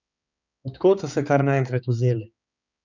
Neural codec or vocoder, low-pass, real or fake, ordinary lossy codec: codec, 16 kHz, 4 kbps, X-Codec, HuBERT features, trained on balanced general audio; 7.2 kHz; fake; none